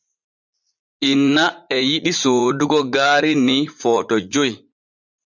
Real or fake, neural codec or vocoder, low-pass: fake; vocoder, 44.1 kHz, 80 mel bands, Vocos; 7.2 kHz